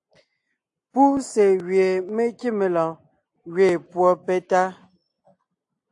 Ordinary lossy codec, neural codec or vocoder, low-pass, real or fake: AAC, 64 kbps; none; 10.8 kHz; real